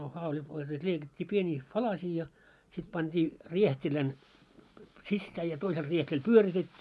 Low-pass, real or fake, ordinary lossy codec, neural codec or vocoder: none; real; none; none